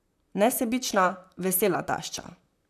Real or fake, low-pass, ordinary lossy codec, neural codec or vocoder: fake; 14.4 kHz; none; vocoder, 44.1 kHz, 128 mel bands, Pupu-Vocoder